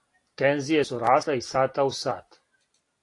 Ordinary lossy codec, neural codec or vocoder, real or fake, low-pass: AAC, 48 kbps; none; real; 10.8 kHz